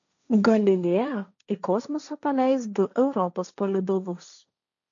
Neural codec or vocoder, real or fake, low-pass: codec, 16 kHz, 1.1 kbps, Voila-Tokenizer; fake; 7.2 kHz